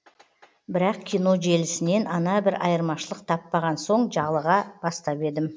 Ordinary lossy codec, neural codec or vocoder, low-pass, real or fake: none; none; none; real